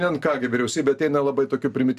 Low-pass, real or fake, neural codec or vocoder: 14.4 kHz; real; none